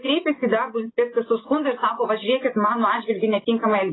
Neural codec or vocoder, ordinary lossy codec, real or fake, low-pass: none; AAC, 16 kbps; real; 7.2 kHz